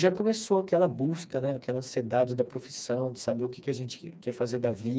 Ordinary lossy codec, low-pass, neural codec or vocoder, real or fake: none; none; codec, 16 kHz, 2 kbps, FreqCodec, smaller model; fake